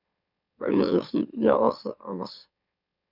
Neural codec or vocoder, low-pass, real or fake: autoencoder, 44.1 kHz, a latent of 192 numbers a frame, MeloTTS; 5.4 kHz; fake